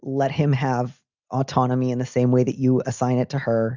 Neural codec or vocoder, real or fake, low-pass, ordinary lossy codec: none; real; 7.2 kHz; Opus, 64 kbps